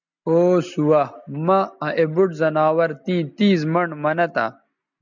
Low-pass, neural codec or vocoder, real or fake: 7.2 kHz; none; real